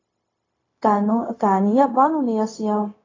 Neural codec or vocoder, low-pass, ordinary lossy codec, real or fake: codec, 16 kHz, 0.4 kbps, LongCat-Audio-Codec; 7.2 kHz; AAC, 32 kbps; fake